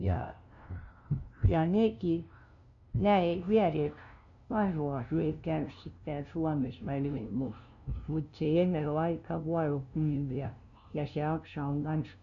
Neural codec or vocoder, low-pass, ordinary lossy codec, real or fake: codec, 16 kHz, 0.5 kbps, FunCodec, trained on LibriTTS, 25 frames a second; 7.2 kHz; none; fake